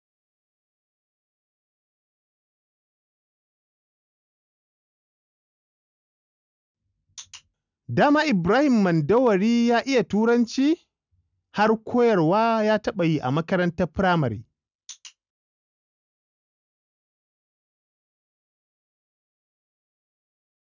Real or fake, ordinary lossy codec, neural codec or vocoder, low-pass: real; none; none; 7.2 kHz